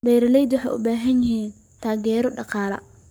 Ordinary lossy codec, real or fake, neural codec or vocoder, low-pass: none; fake; codec, 44.1 kHz, 7.8 kbps, Pupu-Codec; none